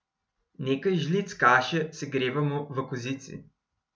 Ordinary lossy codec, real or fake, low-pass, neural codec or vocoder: none; real; none; none